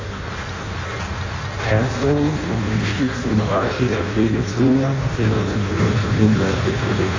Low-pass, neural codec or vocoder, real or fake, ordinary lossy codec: 7.2 kHz; codec, 16 kHz in and 24 kHz out, 0.6 kbps, FireRedTTS-2 codec; fake; AAC, 32 kbps